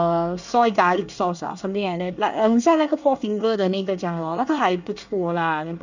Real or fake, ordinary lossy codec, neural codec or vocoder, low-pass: fake; none; codec, 24 kHz, 1 kbps, SNAC; 7.2 kHz